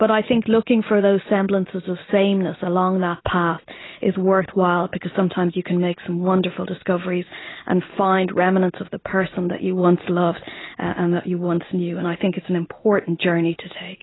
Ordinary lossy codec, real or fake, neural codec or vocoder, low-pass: AAC, 16 kbps; real; none; 7.2 kHz